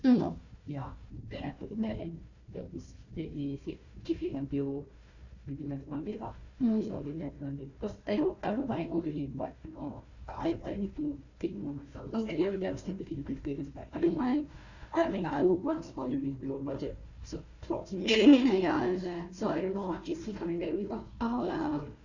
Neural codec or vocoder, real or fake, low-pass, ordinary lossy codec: codec, 16 kHz, 1 kbps, FunCodec, trained on Chinese and English, 50 frames a second; fake; 7.2 kHz; none